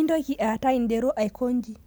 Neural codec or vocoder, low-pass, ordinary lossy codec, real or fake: none; none; none; real